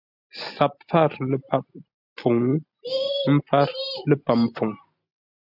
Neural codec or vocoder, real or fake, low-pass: none; real; 5.4 kHz